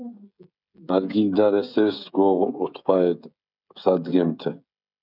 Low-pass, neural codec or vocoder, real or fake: 5.4 kHz; codec, 16 kHz, 16 kbps, FreqCodec, smaller model; fake